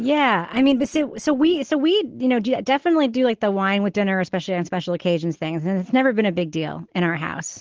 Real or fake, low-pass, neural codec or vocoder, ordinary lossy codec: real; 7.2 kHz; none; Opus, 16 kbps